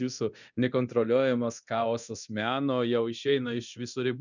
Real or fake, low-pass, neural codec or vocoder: fake; 7.2 kHz; codec, 24 kHz, 0.9 kbps, DualCodec